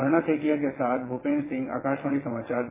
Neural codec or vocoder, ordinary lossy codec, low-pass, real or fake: vocoder, 22.05 kHz, 80 mel bands, WaveNeXt; MP3, 16 kbps; 3.6 kHz; fake